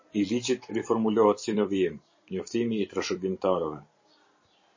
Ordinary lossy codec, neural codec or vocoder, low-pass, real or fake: MP3, 32 kbps; vocoder, 44.1 kHz, 128 mel bands every 256 samples, BigVGAN v2; 7.2 kHz; fake